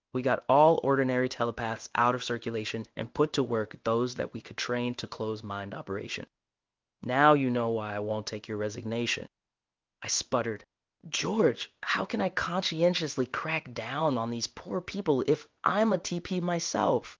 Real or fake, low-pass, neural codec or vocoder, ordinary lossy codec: fake; 7.2 kHz; codec, 16 kHz in and 24 kHz out, 1 kbps, XY-Tokenizer; Opus, 24 kbps